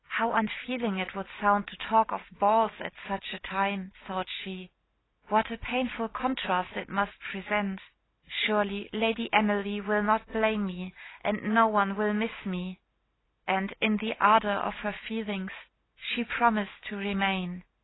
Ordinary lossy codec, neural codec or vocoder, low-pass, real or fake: AAC, 16 kbps; none; 7.2 kHz; real